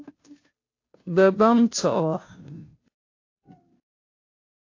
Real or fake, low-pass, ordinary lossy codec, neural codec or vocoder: fake; 7.2 kHz; AAC, 48 kbps; codec, 16 kHz, 0.5 kbps, FunCodec, trained on Chinese and English, 25 frames a second